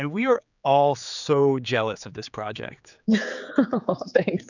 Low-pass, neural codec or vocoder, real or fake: 7.2 kHz; codec, 16 kHz, 4 kbps, X-Codec, HuBERT features, trained on general audio; fake